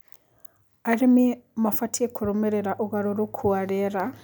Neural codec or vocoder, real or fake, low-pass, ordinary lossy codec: none; real; none; none